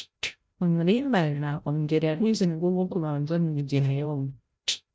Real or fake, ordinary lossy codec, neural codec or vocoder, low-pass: fake; none; codec, 16 kHz, 0.5 kbps, FreqCodec, larger model; none